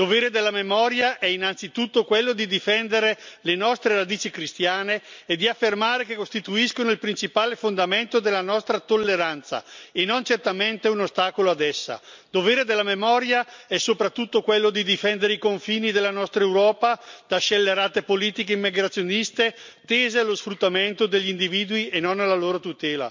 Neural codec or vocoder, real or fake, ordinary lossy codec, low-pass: none; real; MP3, 64 kbps; 7.2 kHz